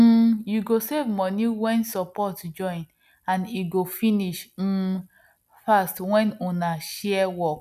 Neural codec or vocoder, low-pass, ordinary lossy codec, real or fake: none; 14.4 kHz; none; real